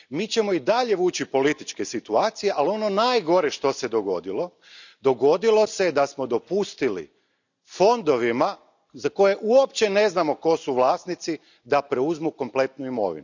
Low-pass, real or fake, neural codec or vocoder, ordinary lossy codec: 7.2 kHz; real; none; none